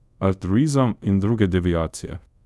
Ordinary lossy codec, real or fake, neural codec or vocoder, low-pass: none; fake; codec, 24 kHz, 0.5 kbps, DualCodec; none